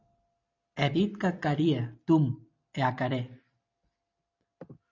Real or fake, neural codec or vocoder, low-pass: real; none; 7.2 kHz